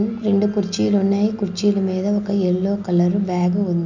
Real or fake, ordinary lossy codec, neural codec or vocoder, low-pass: real; none; none; 7.2 kHz